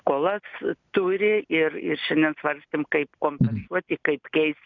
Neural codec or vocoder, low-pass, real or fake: none; 7.2 kHz; real